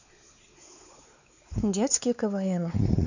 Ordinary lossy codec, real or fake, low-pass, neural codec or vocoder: none; fake; 7.2 kHz; codec, 16 kHz, 4 kbps, X-Codec, WavLM features, trained on Multilingual LibriSpeech